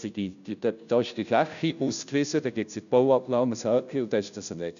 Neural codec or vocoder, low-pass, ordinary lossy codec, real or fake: codec, 16 kHz, 0.5 kbps, FunCodec, trained on Chinese and English, 25 frames a second; 7.2 kHz; none; fake